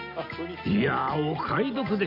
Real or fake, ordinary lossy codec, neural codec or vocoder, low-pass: real; MP3, 48 kbps; none; 5.4 kHz